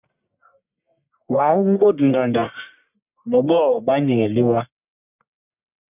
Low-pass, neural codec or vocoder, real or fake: 3.6 kHz; codec, 44.1 kHz, 1.7 kbps, Pupu-Codec; fake